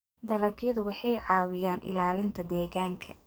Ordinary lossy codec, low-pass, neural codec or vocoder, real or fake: none; none; codec, 44.1 kHz, 2.6 kbps, SNAC; fake